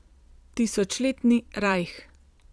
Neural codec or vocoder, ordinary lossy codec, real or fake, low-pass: vocoder, 22.05 kHz, 80 mel bands, Vocos; none; fake; none